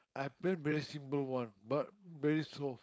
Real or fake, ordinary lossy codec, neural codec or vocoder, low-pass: fake; none; codec, 16 kHz, 4.8 kbps, FACodec; none